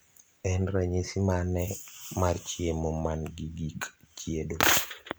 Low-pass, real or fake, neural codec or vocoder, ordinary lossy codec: none; fake; vocoder, 44.1 kHz, 128 mel bands every 256 samples, BigVGAN v2; none